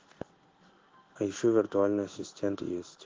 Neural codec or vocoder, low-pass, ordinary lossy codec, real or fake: codec, 16 kHz in and 24 kHz out, 1 kbps, XY-Tokenizer; 7.2 kHz; Opus, 16 kbps; fake